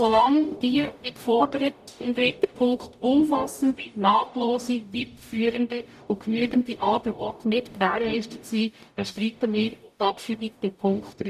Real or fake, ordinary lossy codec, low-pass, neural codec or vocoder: fake; none; 14.4 kHz; codec, 44.1 kHz, 0.9 kbps, DAC